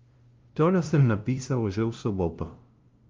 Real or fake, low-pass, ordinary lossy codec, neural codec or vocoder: fake; 7.2 kHz; Opus, 32 kbps; codec, 16 kHz, 0.5 kbps, FunCodec, trained on LibriTTS, 25 frames a second